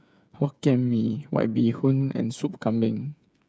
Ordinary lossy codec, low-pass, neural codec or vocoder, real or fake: none; none; codec, 16 kHz, 8 kbps, FreqCodec, smaller model; fake